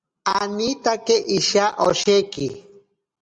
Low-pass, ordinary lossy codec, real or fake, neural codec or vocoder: 9.9 kHz; Opus, 64 kbps; real; none